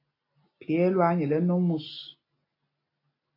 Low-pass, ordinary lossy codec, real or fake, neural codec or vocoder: 5.4 kHz; AAC, 24 kbps; real; none